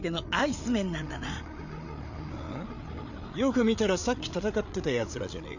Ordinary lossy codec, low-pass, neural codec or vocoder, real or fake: none; 7.2 kHz; codec, 16 kHz, 8 kbps, FreqCodec, larger model; fake